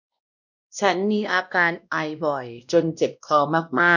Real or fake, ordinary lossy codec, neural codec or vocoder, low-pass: fake; none; codec, 16 kHz, 1 kbps, X-Codec, WavLM features, trained on Multilingual LibriSpeech; 7.2 kHz